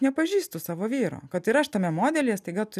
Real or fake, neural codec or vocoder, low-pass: real; none; 14.4 kHz